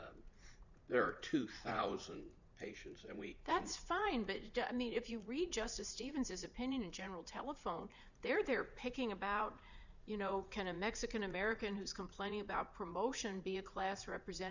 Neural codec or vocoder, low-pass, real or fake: vocoder, 44.1 kHz, 80 mel bands, Vocos; 7.2 kHz; fake